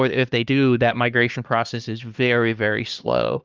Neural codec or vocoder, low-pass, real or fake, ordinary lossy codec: codec, 16 kHz, 2 kbps, X-Codec, HuBERT features, trained on LibriSpeech; 7.2 kHz; fake; Opus, 24 kbps